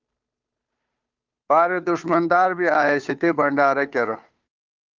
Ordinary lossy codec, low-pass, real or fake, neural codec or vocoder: Opus, 24 kbps; 7.2 kHz; fake; codec, 16 kHz, 2 kbps, FunCodec, trained on Chinese and English, 25 frames a second